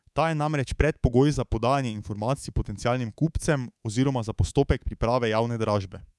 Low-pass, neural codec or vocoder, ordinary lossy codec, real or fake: none; codec, 24 kHz, 3.1 kbps, DualCodec; none; fake